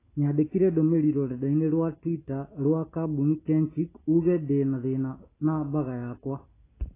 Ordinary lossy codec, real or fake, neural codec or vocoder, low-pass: AAC, 16 kbps; real; none; 3.6 kHz